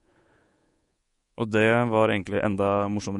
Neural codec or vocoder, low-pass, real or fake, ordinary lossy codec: codec, 24 kHz, 3.1 kbps, DualCodec; 10.8 kHz; fake; MP3, 48 kbps